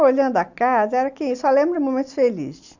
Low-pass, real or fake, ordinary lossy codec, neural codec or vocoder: 7.2 kHz; real; none; none